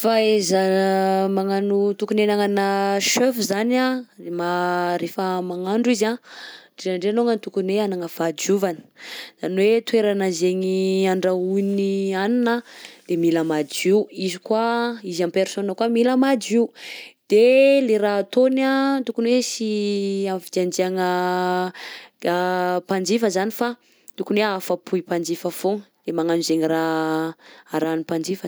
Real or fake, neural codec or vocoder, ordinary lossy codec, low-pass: real; none; none; none